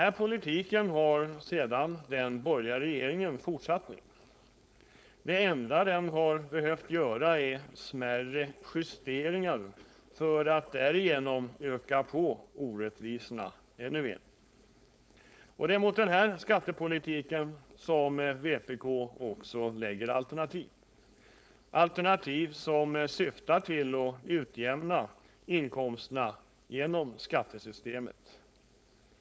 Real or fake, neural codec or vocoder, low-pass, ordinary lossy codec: fake; codec, 16 kHz, 4.8 kbps, FACodec; none; none